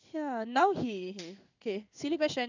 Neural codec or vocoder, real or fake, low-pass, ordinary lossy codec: codec, 16 kHz in and 24 kHz out, 1 kbps, XY-Tokenizer; fake; 7.2 kHz; none